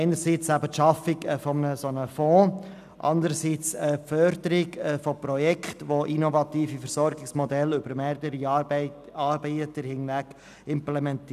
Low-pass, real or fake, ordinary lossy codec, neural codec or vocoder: 14.4 kHz; real; none; none